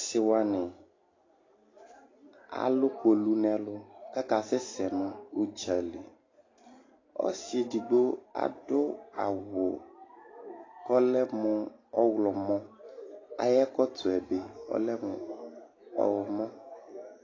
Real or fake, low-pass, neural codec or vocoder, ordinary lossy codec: real; 7.2 kHz; none; AAC, 32 kbps